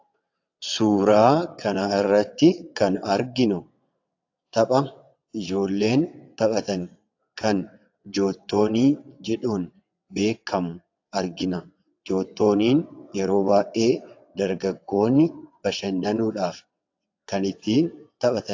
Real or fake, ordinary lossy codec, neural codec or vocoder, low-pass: fake; AAC, 48 kbps; vocoder, 22.05 kHz, 80 mel bands, WaveNeXt; 7.2 kHz